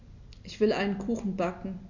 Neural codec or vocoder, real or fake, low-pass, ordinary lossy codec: none; real; 7.2 kHz; none